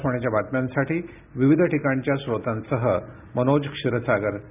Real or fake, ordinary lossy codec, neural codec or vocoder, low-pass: real; none; none; 3.6 kHz